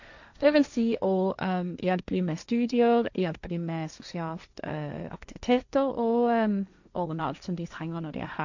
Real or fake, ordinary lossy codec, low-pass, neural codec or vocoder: fake; none; 7.2 kHz; codec, 16 kHz, 1.1 kbps, Voila-Tokenizer